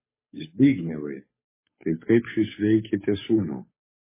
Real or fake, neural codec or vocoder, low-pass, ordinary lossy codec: fake; codec, 16 kHz, 8 kbps, FunCodec, trained on Chinese and English, 25 frames a second; 3.6 kHz; MP3, 16 kbps